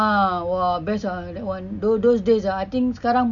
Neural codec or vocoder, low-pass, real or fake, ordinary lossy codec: none; 7.2 kHz; real; none